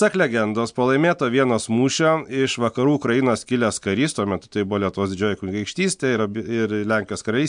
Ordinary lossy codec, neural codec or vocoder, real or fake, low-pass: MP3, 64 kbps; none; real; 9.9 kHz